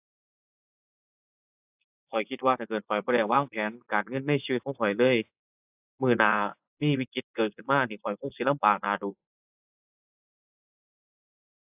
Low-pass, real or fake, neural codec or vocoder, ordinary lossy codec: 3.6 kHz; fake; vocoder, 24 kHz, 100 mel bands, Vocos; AAC, 32 kbps